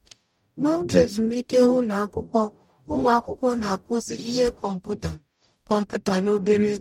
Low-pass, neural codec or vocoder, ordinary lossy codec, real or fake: 19.8 kHz; codec, 44.1 kHz, 0.9 kbps, DAC; MP3, 64 kbps; fake